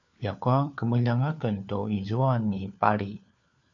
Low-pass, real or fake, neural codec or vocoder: 7.2 kHz; fake; codec, 16 kHz, 4 kbps, FunCodec, trained on LibriTTS, 50 frames a second